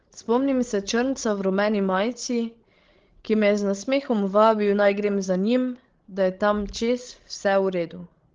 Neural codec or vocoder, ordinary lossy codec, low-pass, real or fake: codec, 16 kHz, 16 kbps, FreqCodec, larger model; Opus, 16 kbps; 7.2 kHz; fake